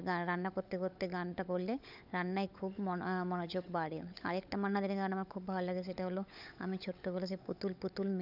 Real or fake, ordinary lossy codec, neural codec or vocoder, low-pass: fake; none; codec, 16 kHz, 16 kbps, FunCodec, trained on LibriTTS, 50 frames a second; 5.4 kHz